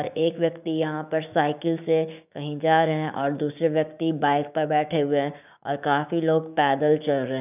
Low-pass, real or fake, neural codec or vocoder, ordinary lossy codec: 3.6 kHz; fake; codec, 16 kHz, 6 kbps, DAC; none